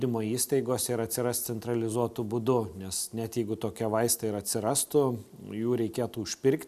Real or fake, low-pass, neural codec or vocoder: real; 14.4 kHz; none